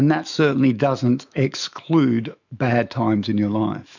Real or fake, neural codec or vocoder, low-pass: fake; autoencoder, 48 kHz, 128 numbers a frame, DAC-VAE, trained on Japanese speech; 7.2 kHz